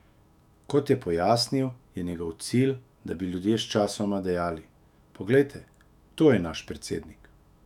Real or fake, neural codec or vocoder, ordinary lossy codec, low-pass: fake; autoencoder, 48 kHz, 128 numbers a frame, DAC-VAE, trained on Japanese speech; none; 19.8 kHz